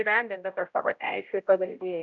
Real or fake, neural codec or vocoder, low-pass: fake; codec, 16 kHz, 0.5 kbps, X-Codec, HuBERT features, trained on general audio; 7.2 kHz